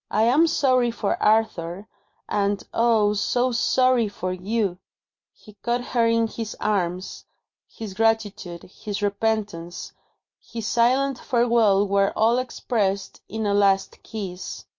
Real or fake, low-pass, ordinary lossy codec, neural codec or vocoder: real; 7.2 kHz; MP3, 48 kbps; none